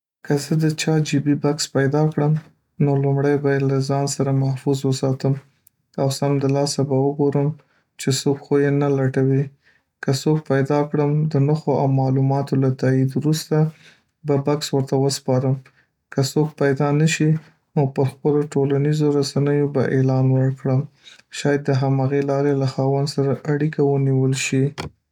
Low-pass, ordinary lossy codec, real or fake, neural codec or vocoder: 19.8 kHz; none; real; none